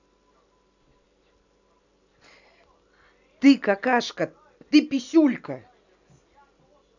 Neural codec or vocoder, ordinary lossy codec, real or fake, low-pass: none; none; real; 7.2 kHz